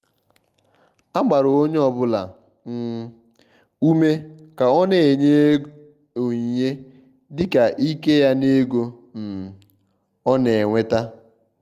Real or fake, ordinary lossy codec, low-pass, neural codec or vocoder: real; Opus, 32 kbps; 14.4 kHz; none